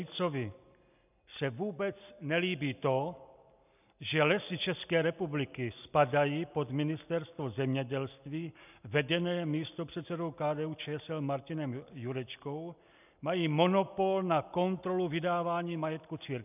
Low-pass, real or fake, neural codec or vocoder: 3.6 kHz; real; none